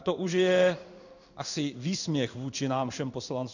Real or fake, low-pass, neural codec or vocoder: fake; 7.2 kHz; codec, 16 kHz in and 24 kHz out, 1 kbps, XY-Tokenizer